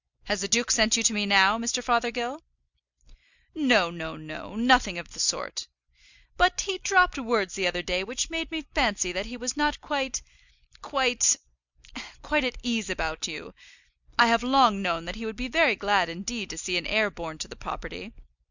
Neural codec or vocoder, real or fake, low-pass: none; real; 7.2 kHz